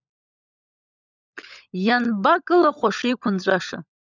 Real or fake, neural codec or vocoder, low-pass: fake; codec, 16 kHz, 16 kbps, FunCodec, trained on LibriTTS, 50 frames a second; 7.2 kHz